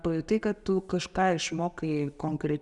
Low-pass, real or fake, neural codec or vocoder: 10.8 kHz; real; none